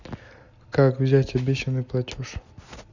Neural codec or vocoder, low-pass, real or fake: none; 7.2 kHz; real